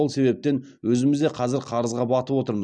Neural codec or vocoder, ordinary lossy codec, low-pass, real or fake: none; none; 9.9 kHz; real